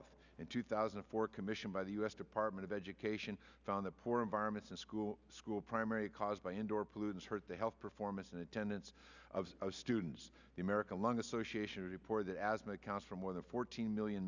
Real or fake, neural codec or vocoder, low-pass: real; none; 7.2 kHz